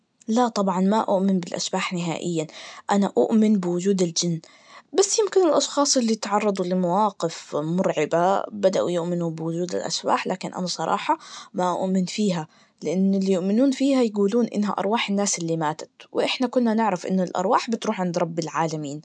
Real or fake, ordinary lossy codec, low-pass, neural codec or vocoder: real; MP3, 96 kbps; 9.9 kHz; none